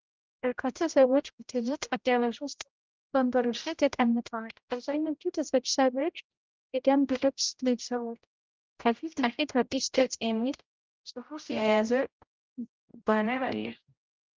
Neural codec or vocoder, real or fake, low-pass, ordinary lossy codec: codec, 16 kHz, 0.5 kbps, X-Codec, HuBERT features, trained on general audio; fake; 7.2 kHz; Opus, 24 kbps